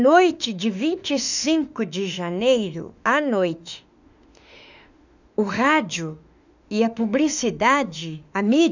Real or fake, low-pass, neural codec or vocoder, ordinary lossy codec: fake; 7.2 kHz; autoencoder, 48 kHz, 32 numbers a frame, DAC-VAE, trained on Japanese speech; none